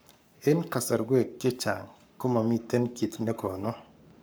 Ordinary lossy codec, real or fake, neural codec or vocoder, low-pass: none; fake; codec, 44.1 kHz, 7.8 kbps, Pupu-Codec; none